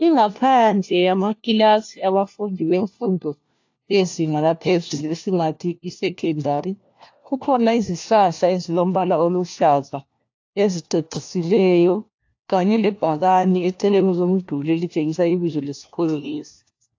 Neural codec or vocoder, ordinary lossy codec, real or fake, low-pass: codec, 16 kHz, 1 kbps, FunCodec, trained on LibriTTS, 50 frames a second; AAC, 48 kbps; fake; 7.2 kHz